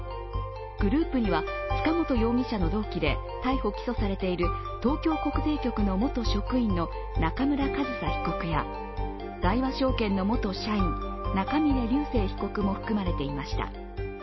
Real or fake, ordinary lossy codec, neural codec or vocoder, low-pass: real; MP3, 24 kbps; none; 7.2 kHz